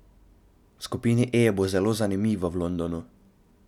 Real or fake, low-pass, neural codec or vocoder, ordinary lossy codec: real; 19.8 kHz; none; none